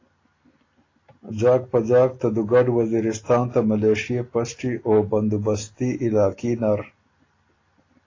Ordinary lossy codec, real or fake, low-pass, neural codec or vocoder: AAC, 32 kbps; real; 7.2 kHz; none